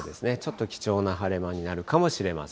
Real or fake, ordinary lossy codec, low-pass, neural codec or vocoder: real; none; none; none